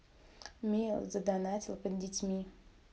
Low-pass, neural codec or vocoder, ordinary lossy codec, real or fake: none; none; none; real